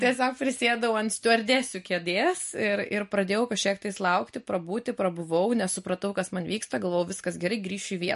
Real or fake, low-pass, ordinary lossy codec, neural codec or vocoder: real; 14.4 kHz; MP3, 48 kbps; none